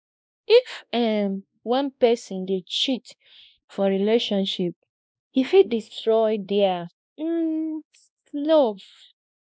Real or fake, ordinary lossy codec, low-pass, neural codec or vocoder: fake; none; none; codec, 16 kHz, 1 kbps, X-Codec, WavLM features, trained on Multilingual LibriSpeech